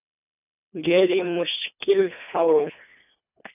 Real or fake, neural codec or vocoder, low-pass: fake; codec, 24 kHz, 1.5 kbps, HILCodec; 3.6 kHz